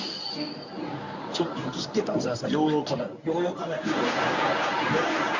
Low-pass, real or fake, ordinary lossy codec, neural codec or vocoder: 7.2 kHz; fake; none; codec, 24 kHz, 0.9 kbps, WavTokenizer, medium speech release version 1